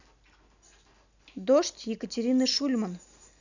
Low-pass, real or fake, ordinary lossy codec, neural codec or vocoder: 7.2 kHz; real; none; none